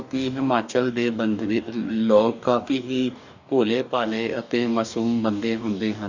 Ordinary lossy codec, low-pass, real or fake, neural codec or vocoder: none; 7.2 kHz; fake; codec, 44.1 kHz, 2.6 kbps, DAC